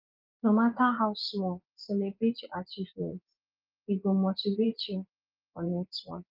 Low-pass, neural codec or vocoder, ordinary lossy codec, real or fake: 5.4 kHz; vocoder, 24 kHz, 100 mel bands, Vocos; Opus, 32 kbps; fake